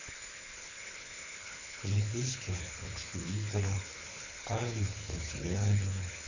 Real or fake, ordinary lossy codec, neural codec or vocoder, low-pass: fake; none; codec, 24 kHz, 3 kbps, HILCodec; 7.2 kHz